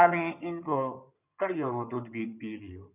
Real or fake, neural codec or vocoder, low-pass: fake; codec, 16 kHz in and 24 kHz out, 2.2 kbps, FireRedTTS-2 codec; 3.6 kHz